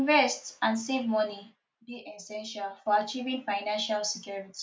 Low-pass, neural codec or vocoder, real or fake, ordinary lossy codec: none; none; real; none